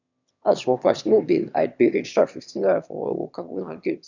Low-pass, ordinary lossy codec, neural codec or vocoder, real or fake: 7.2 kHz; none; autoencoder, 22.05 kHz, a latent of 192 numbers a frame, VITS, trained on one speaker; fake